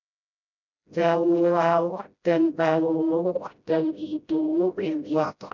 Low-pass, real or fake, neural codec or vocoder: 7.2 kHz; fake; codec, 16 kHz, 0.5 kbps, FreqCodec, smaller model